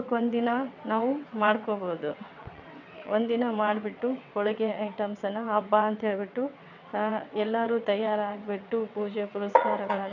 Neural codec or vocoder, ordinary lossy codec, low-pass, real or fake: vocoder, 22.05 kHz, 80 mel bands, WaveNeXt; none; 7.2 kHz; fake